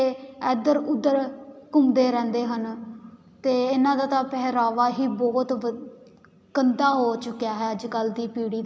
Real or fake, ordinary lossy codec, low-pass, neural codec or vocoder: real; none; none; none